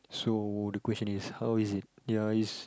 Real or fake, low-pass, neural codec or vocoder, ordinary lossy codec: real; none; none; none